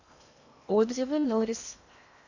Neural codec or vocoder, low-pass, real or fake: codec, 16 kHz in and 24 kHz out, 0.8 kbps, FocalCodec, streaming, 65536 codes; 7.2 kHz; fake